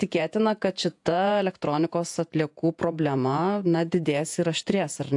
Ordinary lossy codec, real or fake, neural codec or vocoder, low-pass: AAC, 64 kbps; fake; vocoder, 48 kHz, 128 mel bands, Vocos; 10.8 kHz